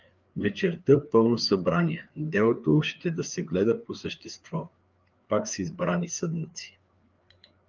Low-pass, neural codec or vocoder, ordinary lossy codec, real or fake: 7.2 kHz; codec, 16 kHz, 4 kbps, FreqCodec, larger model; Opus, 24 kbps; fake